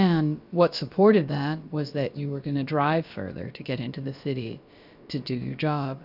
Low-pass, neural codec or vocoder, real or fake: 5.4 kHz; codec, 16 kHz, about 1 kbps, DyCAST, with the encoder's durations; fake